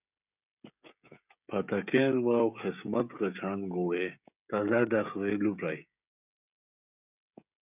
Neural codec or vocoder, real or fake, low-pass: codec, 16 kHz, 16 kbps, FreqCodec, smaller model; fake; 3.6 kHz